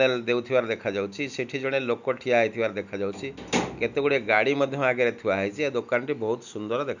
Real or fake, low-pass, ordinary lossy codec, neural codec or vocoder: real; 7.2 kHz; none; none